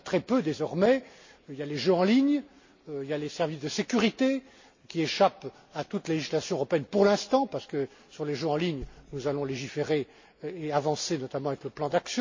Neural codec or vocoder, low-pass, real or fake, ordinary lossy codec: none; 7.2 kHz; real; MP3, 32 kbps